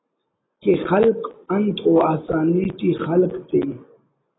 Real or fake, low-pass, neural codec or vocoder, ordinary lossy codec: real; 7.2 kHz; none; AAC, 16 kbps